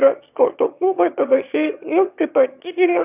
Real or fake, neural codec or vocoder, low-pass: fake; autoencoder, 22.05 kHz, a latent of 192 numbers a frame, VITS, trained on one speaker; 3.6 kHz